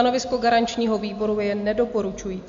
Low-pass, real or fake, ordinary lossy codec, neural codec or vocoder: 7.2 kHz; real; AAC, 64 kbps; none